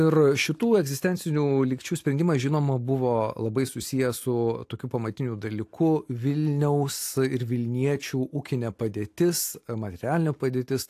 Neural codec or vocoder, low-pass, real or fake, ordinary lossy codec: none; 14.4 kHz; real; AAC, 64 kbps